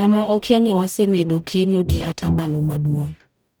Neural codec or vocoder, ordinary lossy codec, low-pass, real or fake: codec, 44.1 kHz, 0.9 kbps, DAC; none; none; fake